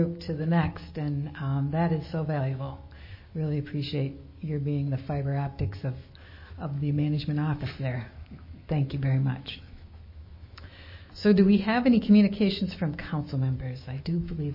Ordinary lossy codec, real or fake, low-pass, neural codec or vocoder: MP3, 24 kbps; fake; 5.4 kHz; autoencoder, 48 kHz, 128 numbers a frame, DAC-VAE, trained on Japanese speech